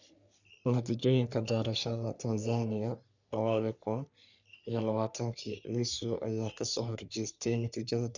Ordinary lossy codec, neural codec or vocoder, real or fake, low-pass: none; codec, 44.1 kHz, 3.4 kbps, Pupu-Codec; fake; 7.2 kHz